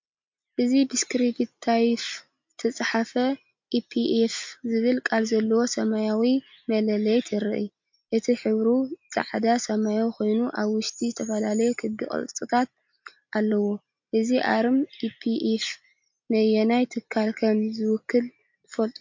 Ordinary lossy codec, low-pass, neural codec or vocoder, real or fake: MP3, 48 kbps; 7.2 kHz; none; real